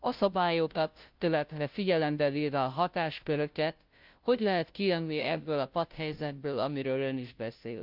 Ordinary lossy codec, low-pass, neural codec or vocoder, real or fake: Opus, 24 kbps; 5.4 kHz; codec, 16 kHz, 0.5 kbps, FunCodec, trained on Chinese and English, 25 frames a second; fake